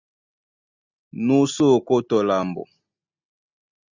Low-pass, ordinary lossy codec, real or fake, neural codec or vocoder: 7.2 kHz; Opus, 64 kbps; real; none